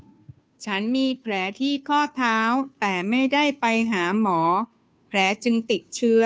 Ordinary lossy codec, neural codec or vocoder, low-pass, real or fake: none; codec, 16 kHz, 2 kbps, FunCodec, trained on Chinese and English, 25 frames a second; none; fake